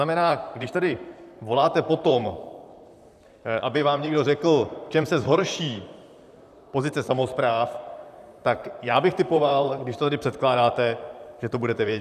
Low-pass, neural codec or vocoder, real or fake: 14.4 kHz; vocoder, 44.1 kHz, 128 mel bands, Pupu-Vocoder; fake